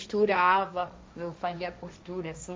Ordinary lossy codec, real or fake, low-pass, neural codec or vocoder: none; fake; none; codec, 16 kHz, 1.1 kbps, Voila-Tokenizer